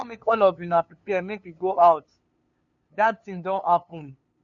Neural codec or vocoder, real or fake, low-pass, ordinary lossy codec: codec, 16 kHz, 2 kbps, FunCodec, trained on LibriTTS, 25 frames a second; fake; 7.2 kHz; none